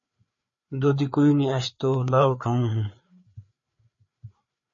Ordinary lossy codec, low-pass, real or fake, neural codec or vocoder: MP3, 32 kbps; 7.2 kHz; fake; codec, 16 kHz, 4 kbps, FreqCodec, larger model